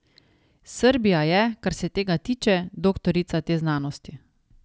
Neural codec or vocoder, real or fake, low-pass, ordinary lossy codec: none; real; none; none